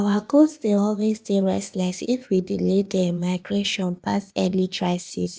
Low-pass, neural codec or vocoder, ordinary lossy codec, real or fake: none; codec, 16 kHz, 0.8 kbps, ZipCodec; none; fake